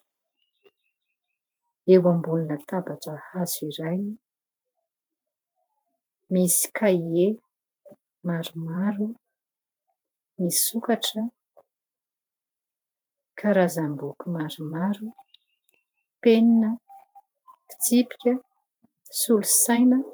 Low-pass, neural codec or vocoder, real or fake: 19.8 kHz; vocoder, 44.1 kHz, 128 mel bands every 512 samples, BigVGAN v2; fake